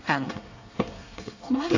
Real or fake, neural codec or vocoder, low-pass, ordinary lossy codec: fake; codec, 24 kHz, 1 kbps, SNAC; 7.2 kHz; AAC, 48 kbps